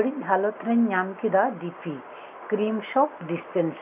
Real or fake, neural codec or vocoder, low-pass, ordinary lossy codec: real; none; 3.6 kHz; none